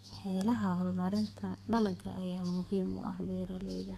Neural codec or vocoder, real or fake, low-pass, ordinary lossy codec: codec, 32 kHz, 1.9 kbps, SNAC; fake; 14.4 kHz; none